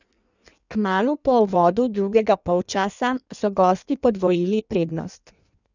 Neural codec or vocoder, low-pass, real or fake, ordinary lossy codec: codec, 16 kHz in and 24 kHz out, 1.1 kbps, FireRedTTS-2 codec; 7.2 kHz; fake; none